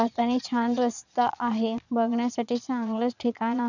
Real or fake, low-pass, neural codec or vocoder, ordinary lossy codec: fake; 7.2 kHz; vocoder, 22.05 kHz, 80 mel bands, Vocos; none